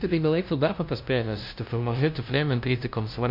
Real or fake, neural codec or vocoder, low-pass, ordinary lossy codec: fake; codec, 16 kHz, 0.5 kbps, FunCodec, trained on LibriTTS, 25 frames a second; 5.4 kHz; MP3, 48 kbps